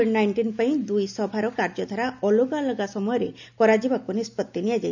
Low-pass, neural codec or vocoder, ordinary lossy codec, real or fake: 7.2 kHz; none; none; real